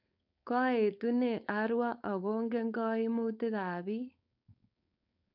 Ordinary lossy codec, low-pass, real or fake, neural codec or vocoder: none; 5.4 kHz; fake; codec, 16 kHz, 4.8 kbps, FACodec